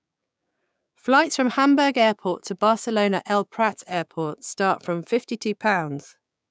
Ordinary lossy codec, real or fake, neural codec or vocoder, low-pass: none; fake; codec, 16 kHz, 6 kbps, DAC; none